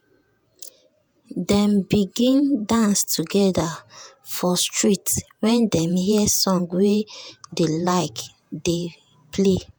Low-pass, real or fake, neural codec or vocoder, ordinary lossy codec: none; fake; vocoder, 48 kHz, 128 mel bands, Vocos; none